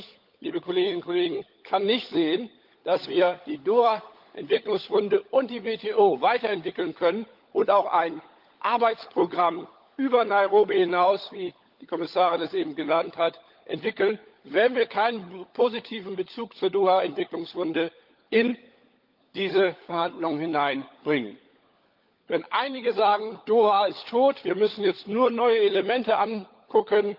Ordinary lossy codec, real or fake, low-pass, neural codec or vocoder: Opus, 32 kbps; fake; 5.4 kHz; codec, 16 kHz, 16 kbps, FunCodec, trained on LibriTTS, 50 frames a second